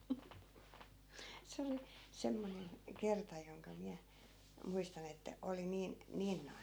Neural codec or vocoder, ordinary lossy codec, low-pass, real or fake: none; none; none; real